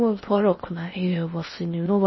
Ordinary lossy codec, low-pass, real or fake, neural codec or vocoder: MP3, 24 kbps; 7.2 kHz; fake; codec, 16 kHz in and 24 kHz out, 0.6 kbps, FocalCodec, streaming, 4096 codes